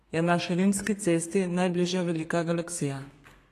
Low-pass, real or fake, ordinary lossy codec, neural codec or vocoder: 14.4 kHz; fake; AAC, 48 kbps; codec, 32 kHz, 1.9 kbps, SNAC